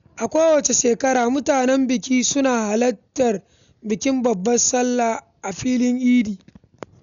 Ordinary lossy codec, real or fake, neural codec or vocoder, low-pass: none; real; none; 7.2 kHz